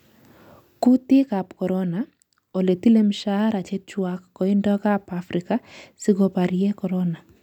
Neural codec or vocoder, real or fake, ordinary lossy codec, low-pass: none; real; none; 19.8 kHz